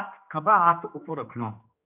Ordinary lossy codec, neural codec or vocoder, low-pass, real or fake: AAC, 32 kbps; codec, 16 kHz, 2 kbps, X-Codec, HuBERT features, trained on general audio; 3.6 kHz; fake